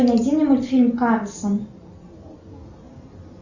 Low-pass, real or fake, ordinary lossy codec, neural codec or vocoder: 7.2 kHz; real; Opus, 64 kbps; none